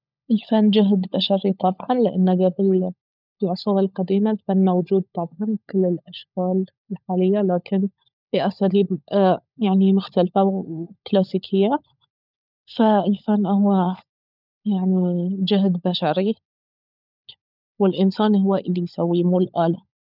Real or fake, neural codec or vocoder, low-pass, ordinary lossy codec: fake; codec, 16 kHz, 16 kbps, FunCodec, trained on LibriTTS, 50 frames a second; 5.4 kHz; none